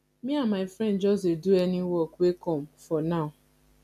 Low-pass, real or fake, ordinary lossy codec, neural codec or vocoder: 14.4 kHz; real; none; none